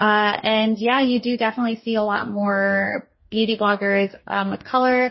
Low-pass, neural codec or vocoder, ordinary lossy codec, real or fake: 7.2 kHz; codec, 44.1 kHz, 2.6 kbps, DAC; MP3, 24 kbps; fake